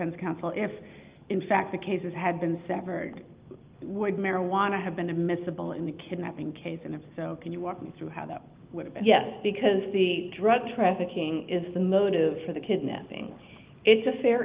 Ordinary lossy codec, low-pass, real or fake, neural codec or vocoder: Opus, 32 kbps; 3.6 kHz; real; none